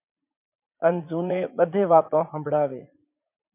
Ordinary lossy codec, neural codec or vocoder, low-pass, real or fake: MP3, 32 kbps; vocoder, 44.1 kHz, 80 mel bands, Vocos; 3.6 kHz; fake